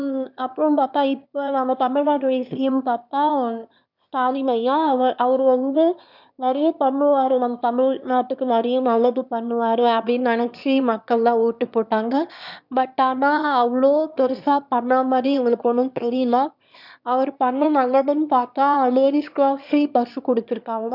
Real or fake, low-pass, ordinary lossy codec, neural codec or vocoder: fake; 5.4 kHz; none; autoencoder, 22.05 kHz, a latent of 192 numbers a frame, VITS, trained on one speaker